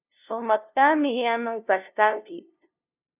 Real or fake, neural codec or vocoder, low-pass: fake; codec, 16 kHz, 0.5 kbps, FunCodec, trained on LibriTTS, 25 frames a second; 3.6 kHz